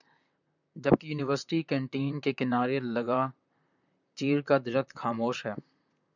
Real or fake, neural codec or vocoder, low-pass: fake; vocoder, 44.1 kHz, 128 mel bands, Pupu-Vocoder; 7.2 kHz